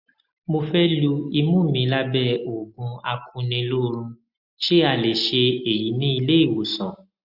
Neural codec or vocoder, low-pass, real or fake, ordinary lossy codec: none; 5.4 kHz; real; Opus, 64 kbps